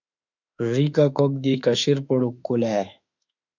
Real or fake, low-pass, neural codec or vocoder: fake; 7.2 kHz; autoencoder, 48 kHz, 32 numbers a frame, DAC-VAE, trained on Japanese speech